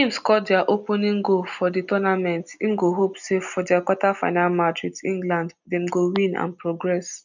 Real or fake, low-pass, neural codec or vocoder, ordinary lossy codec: real; 7.2 kHz; none; none